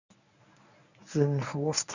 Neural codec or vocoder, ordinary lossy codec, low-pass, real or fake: codec, 24 kHz, 0.9 kbps, WavTokenizer, medium speech release version 1; none; 7.2 kHz; fake